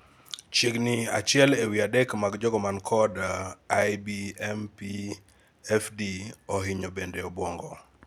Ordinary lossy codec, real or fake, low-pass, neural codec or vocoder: none; real; 19.8 kHz; none